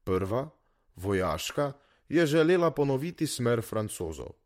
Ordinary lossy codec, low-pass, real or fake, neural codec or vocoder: MP3, 64 kbps; 19.8 kHz; fake; vocoder, 44.1 kHz, 128 mel bands, Pupu-Vocoder